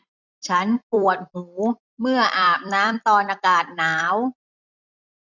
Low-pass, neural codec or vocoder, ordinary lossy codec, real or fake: 7.2 kHz; vocoder, 44.1 kHz, 128 mel bands every 256 samples, BigVGAN v2; none; fake